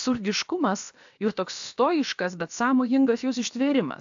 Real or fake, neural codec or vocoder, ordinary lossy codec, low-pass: fake; codec, 16 kHz, about 1 kbps, DyCAST, with the encoder's durations; AAC, 64 kbps; 7.2 kHz